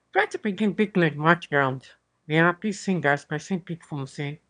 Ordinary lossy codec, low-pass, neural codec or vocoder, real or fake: none; 9.9 kHz; autoencoder, 22.05 kHz, a latent of 192 numbers a frame, VITS, trained on one speaker; fake